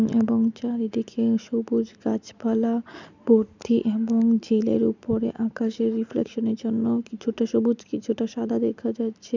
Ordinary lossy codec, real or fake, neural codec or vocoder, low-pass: none; real; none; 7.2 kHz